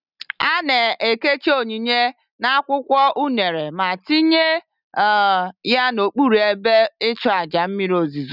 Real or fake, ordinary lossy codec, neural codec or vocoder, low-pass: real; none; none; 5.4 kHz